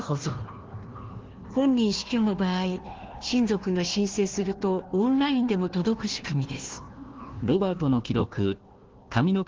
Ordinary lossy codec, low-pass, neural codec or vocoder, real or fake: Opus, 16 kbps; 7.2 kHz; codec, 16 kHz, 1 kbps, FunCodec, trained on Chinese and English, 50 frames a second; fake